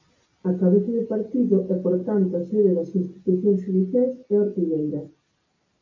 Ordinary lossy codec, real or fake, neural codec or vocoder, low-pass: MP3, 48 kbps; real; none; 7.2 kHz